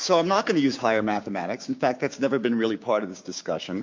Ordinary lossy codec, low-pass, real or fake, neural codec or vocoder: MP3, 48 kbps; 7.2 kHz; fake; codec, 16 kHz, 6 kbps, DAC